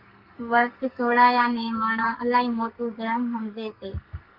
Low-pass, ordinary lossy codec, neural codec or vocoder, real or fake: 5.4 kHz; Opus, 24 kbps; codec, 44.1 kHz, 2.6 kbps, SNAC; fake